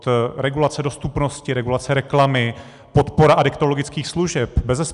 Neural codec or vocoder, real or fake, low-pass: none; real; 10.8 kHz